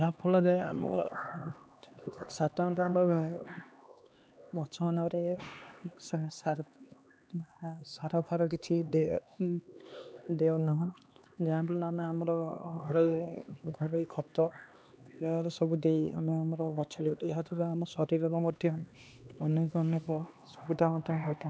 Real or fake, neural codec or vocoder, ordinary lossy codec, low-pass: fake; codec, 16 kHz, 2 kbps, X-Codec, HuBERT features, trained on LibriSpeech; none; none